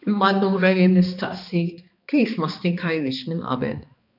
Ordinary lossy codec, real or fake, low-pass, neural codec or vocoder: AAC, 48 kbps; fake; 5.4 kHz; codec, 16 kHz, 2 kbps, X-Codec, HuBERT features, trained on balanced general audio